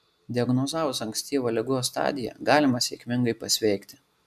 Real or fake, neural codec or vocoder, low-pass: real; none; 14.4 kHz